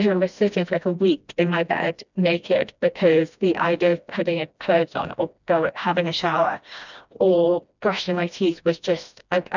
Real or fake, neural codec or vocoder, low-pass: fake; codec, 16 kHz, 1 kbps, FreqCodec, smaller model; 7.2 kHz